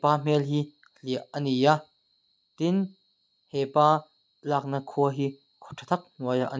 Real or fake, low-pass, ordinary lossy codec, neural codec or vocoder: real; none; none; none